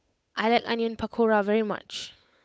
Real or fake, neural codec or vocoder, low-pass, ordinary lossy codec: fake; codec, 16 kHz, 8 kbps, FunCodec, trained on Chinese and English, 25 frames a second; none; none